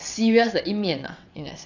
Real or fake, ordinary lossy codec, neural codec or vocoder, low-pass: fake; none; vocoder, 22.05 kHz, 80 mel bands, WaveNeXt; 7.2 kHz